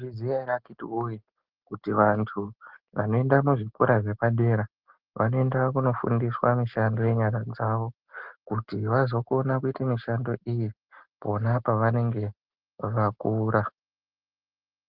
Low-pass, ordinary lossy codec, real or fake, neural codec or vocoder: 5.4 kHz; Opus, 16 kbps; real; none